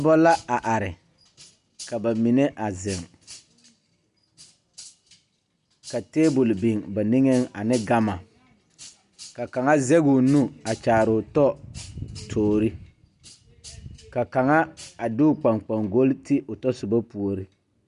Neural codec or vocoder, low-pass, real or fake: none; 10.8 kHz; real